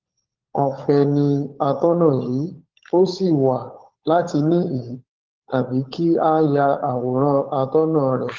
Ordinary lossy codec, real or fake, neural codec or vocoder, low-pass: Opus, 16 kbps; fake; codec, 16 kHz, 16 kbps, FunCodec, trained on LibriTTS, 50 frames a second; 7.2 kHz